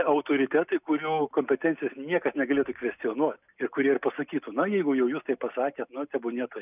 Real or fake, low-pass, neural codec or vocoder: real; 3.6 kHz; none